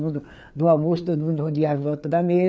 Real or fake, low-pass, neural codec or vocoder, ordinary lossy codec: fake; none; codec, 16 kHz, 4 kbps, FreqCodec, larger model; none